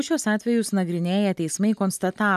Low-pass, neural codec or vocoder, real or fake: 14.4 kHz; none; real